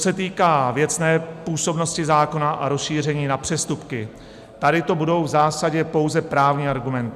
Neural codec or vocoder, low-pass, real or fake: none; 14.4 kHz; real